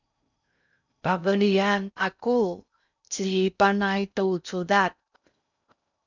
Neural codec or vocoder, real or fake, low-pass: codec, 16 kHz in and 24 kHz out, 0.6 kbps, FocalCodec, streaming, 4096 codes; fake; 7.2 kHz